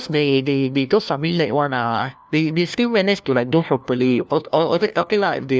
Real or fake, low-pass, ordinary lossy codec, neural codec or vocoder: fake; none; none; codec, 16 kHz, 1 kbps, FunCodec, trained on LibriTTS, 50 frames a second